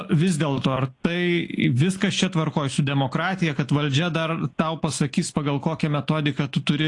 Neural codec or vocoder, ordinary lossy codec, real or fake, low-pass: none; AAC, 48 kbps; real; 10.8 kHz